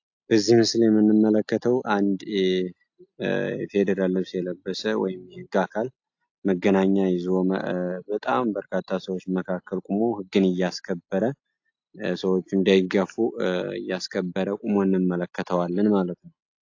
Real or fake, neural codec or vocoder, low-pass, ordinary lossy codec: real; none; 7.2 kHz; AAC, 48 kbps